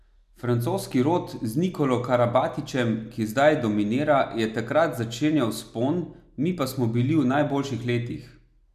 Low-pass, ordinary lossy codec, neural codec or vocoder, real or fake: 14.4 kHz; none; none; real